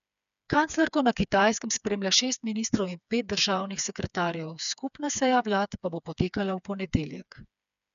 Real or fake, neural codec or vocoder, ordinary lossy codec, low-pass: fake; codec, 16 kHz, 4 kbps, FreqCodec, smaller model; none; 7.2 kHz